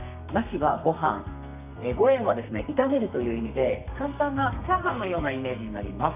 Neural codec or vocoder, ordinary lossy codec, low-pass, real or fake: codec, 32 kHz, 1.9 kbps, SNAC; none; 3.6 kHz; fake